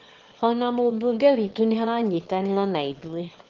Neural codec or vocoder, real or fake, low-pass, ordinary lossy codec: autoencoder, 22.05 kHz, a latent of 192 numbers a frame, VITS, trained on one speaker; fake; 7.2 kHz; Opus, 16 kbps